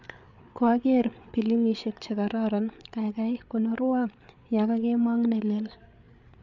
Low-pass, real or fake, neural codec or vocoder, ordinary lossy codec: 7.2 kHz; fake; codec, 16 kHz, 8 kbps, FreqCodec, larger model; none